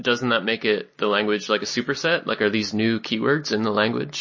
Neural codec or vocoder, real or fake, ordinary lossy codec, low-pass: none; real; MP3, 32 kbps; 7.2 kHz